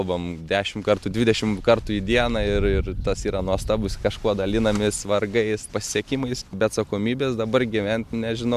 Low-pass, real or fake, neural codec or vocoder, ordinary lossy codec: 14.4 kHz; real; none; MP3, 96 kbps